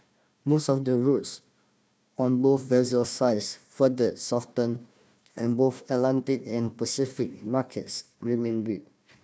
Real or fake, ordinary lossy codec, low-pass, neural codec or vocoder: fake; none; none; codec, 16 kHz, 1 kbps, FunCodec, trained on Chinese and English, 50 frames a second